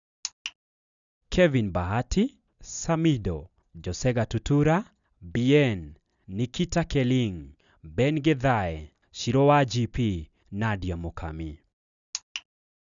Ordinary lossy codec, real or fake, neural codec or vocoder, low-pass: none; real; none; 7.2 kHz